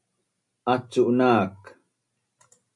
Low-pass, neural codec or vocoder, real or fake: 10.8 kHz; none; real